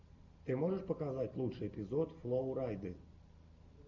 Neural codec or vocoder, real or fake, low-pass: none; real; 7.2 kHz